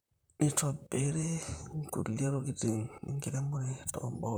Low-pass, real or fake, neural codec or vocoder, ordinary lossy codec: none; fake; vocoder, 44.1 kHz, 128 mel bands, Pupu-Vocoder; none